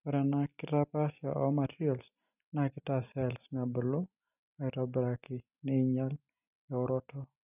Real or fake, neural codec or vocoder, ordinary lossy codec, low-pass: real; none; none; 3.6 kHz